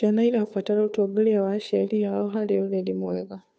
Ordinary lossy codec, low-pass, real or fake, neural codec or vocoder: none; none; fake; codec, 16 kHz, 2 kbps, FunCodec, trained on Chinese and English, 25 frames a second